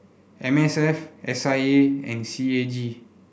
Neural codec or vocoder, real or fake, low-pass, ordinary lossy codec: none; real; none; none